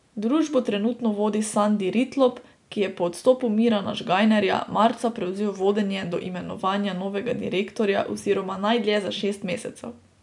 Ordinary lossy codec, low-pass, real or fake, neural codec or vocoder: none; 10.8 kHz; real; none